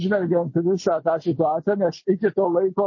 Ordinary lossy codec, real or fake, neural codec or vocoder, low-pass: MP3, 32 kbps; real; none; 7.2 kHz